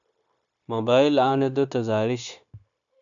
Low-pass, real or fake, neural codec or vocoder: 7.2 kHz; fake; codec, 16 kHz, 0.9 kbps, LongCat-Audio-Codec